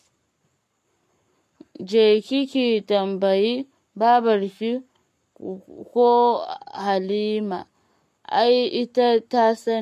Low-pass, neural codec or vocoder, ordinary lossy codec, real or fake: 14.4 kHz; codec, 44.1 kHz, 7.8 kbps, Pupu-Codec; MP3, 64 kbps; fake